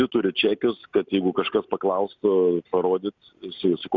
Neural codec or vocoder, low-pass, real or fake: none; 7.2 kHz; real